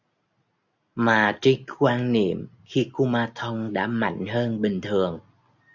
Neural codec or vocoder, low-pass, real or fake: none; 7.2 kHz; real